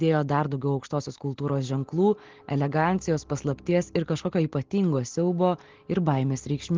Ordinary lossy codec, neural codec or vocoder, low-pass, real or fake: Opus, 16 kbps; none; 7.2 kHz; real